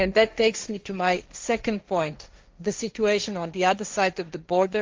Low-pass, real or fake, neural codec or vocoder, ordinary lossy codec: 7.2 kHz; fake; codec, 16 kHz, 1.1 kbps, Voila-Tokenizer; Opus, 32 kbps